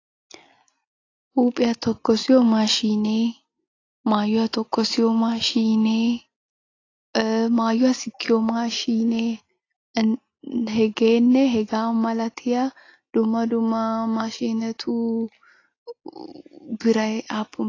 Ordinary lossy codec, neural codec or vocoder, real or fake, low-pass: AAC, 32 kbps; none; real; 7.2 kHz